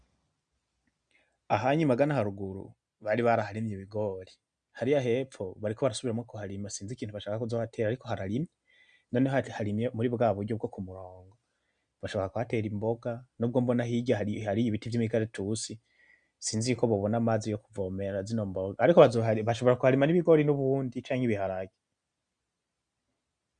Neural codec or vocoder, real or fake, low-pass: none; real; 9.9 kHz